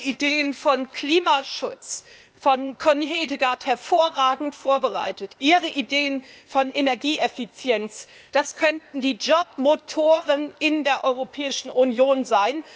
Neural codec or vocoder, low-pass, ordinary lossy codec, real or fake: codec, 16 kHz, 0.8 kbps, ZipCodec; none; none; fake